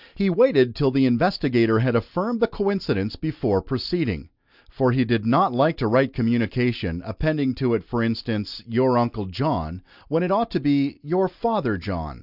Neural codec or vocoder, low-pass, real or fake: none; 5.4 kHz; real